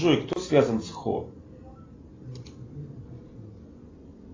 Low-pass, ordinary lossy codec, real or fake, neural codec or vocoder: 7.2 kHz; AAC, 32 kbps; real; none